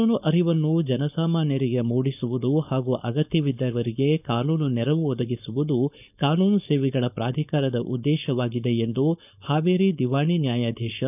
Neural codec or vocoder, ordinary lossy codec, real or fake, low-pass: codec, 16 kHz, 4.8 kbps, FACodec; none; fake; 3.6 kHz